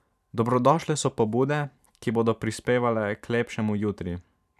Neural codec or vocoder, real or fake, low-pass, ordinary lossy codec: vocoder, 44.1 kHz, 128 mel bands every 512 samples, BigVGAN v2; fake; 14.4 kHz; none